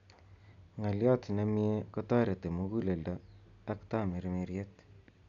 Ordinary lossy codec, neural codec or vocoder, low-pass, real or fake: none; none; 7.2 kHz; real